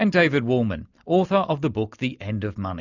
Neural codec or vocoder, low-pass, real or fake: none; 7.2 kHz; real